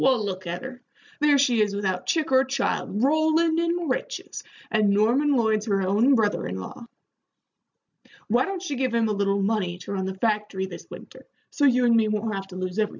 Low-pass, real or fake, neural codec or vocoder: 7.2 kHz; real; none